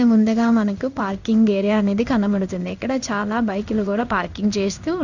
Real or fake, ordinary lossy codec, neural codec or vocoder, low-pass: fake; none; codec, 16 kHz in and 24 kHz out, 1 kbps, XY-Tokenizer; 7.2 kHz